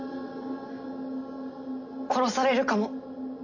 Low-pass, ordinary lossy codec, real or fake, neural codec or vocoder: 7.2 kHz; AAC, 48 kbps; real; none